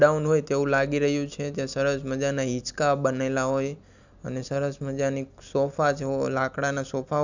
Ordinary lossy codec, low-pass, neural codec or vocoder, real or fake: none; 7.2 kHz; none; real